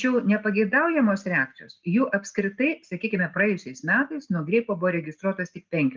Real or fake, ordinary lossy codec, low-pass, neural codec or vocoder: real; Opus, 24 kbps; 7.2 kHz; none